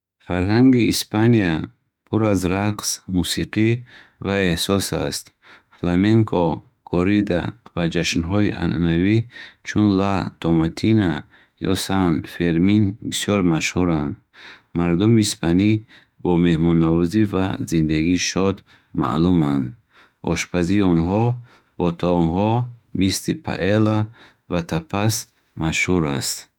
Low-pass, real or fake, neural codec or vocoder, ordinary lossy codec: 19.8 kHz; fake; autoencoder, 48 kHz, 32 numbers a frame, DAC-VAE, trained on Japanese speech; none